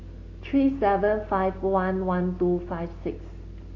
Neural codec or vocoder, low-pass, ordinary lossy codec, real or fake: none; 7.2 kHz; AAC, 32 kbps; real